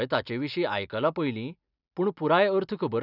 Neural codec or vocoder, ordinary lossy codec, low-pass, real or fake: none; none; 5.4 kHz; real